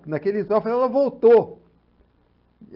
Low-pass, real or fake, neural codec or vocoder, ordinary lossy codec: 5.4 kHz; real; none; Opus, 32 kbps